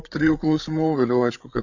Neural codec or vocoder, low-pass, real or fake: codec, 16 kHz, 8 kbps, FreqCodec, larger model; 7.2 kHz; fake